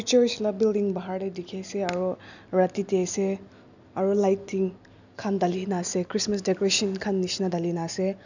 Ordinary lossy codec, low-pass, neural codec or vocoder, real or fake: none; 7.2 kHz; none; real